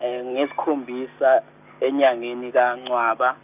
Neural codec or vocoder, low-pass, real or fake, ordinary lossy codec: none; 3.6 kHz; real; none